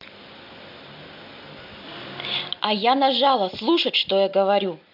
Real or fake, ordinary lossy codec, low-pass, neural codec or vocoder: real; MP3, 48 kbps; 5.4 kHz; none